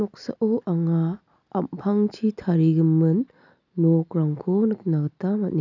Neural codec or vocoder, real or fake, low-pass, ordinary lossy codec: none; real; 7.2 kHz; none